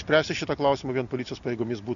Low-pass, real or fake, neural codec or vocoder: 7.2 kHz; real; none